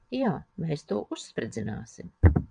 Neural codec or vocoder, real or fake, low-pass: vocoder, 22.05 kHz, 80 mel bands, WaveNeXt; fake; 9.9 kHz